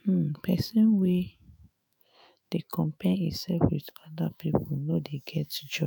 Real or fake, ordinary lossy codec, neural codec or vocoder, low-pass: fake; none; autoencoder, 48 kHz, 128 numbers a frame, DAC-VAE, trained on Japanese speech; none